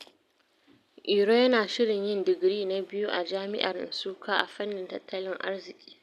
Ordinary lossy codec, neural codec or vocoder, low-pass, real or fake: none; none; 14.4 kHz; real